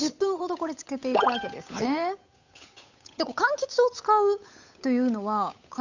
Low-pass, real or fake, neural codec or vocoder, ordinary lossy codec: 7.2 kHz; fake; codec, 16 kHz, 8 kbps, FunCodec, trained on Chinese and English, 25 frames a second; none